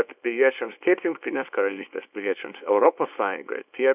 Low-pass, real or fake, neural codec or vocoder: 3.6 kHz; fake; codec, 24 kHz, 0.9 kbps, WavTokenizer, small release